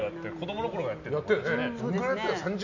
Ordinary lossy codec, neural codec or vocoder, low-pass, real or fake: none; none; 7.2 kHz; real